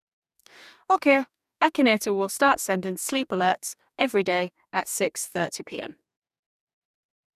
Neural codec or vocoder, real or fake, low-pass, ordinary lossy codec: codec, 44.1 kHz, 2.6 kbps, DAC; fake; 14.4 kHz; none